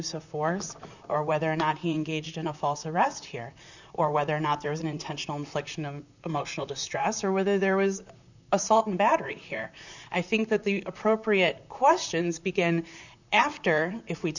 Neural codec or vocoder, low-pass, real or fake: vocoder, 44.1 kHz, 128 mel bands, Pupu-Vocoder; 7.2 kHz; fake